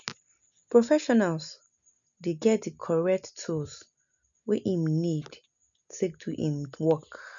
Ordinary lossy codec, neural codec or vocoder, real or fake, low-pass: none; none; real; 7.2 kHz